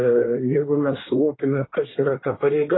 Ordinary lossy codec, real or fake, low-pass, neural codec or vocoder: AAC, 16 kbps; fake; 7.2 kHz; codec, 24 kHz, 1 kbps, SNAC